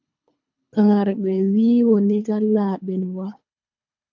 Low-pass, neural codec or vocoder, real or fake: 7.2 kHz; codec, 24 kHz, 3 kbps, HILCodec; fake